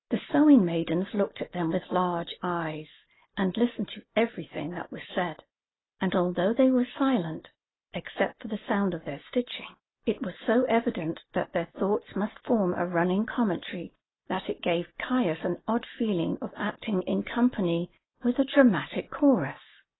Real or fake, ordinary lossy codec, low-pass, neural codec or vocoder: real; AAC, 16 kbps; 7.2 kHz; none